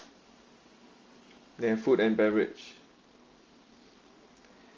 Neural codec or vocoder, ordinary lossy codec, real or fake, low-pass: none; Opus, 32 kbps; real; 7.2 kHz